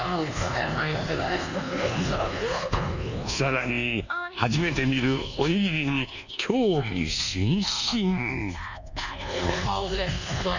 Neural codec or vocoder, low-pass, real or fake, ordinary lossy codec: codec, 24 kHz, 1.2 kbps, DualCodec; 7.2 kHz; fake; none